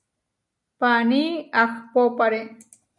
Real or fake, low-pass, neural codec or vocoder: real; 10.8 kHz; none